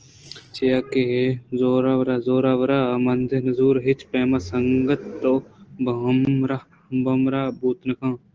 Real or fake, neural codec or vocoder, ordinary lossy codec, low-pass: real; none; Opus, 16 kbps; 7.2 kHz